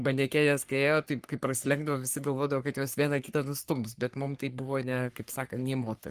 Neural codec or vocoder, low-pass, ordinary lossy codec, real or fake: codec, 44.1 kHz, 3.4 kbps, Pupu-Codec; 14.4 kHz; Opus, 24 kbps; fake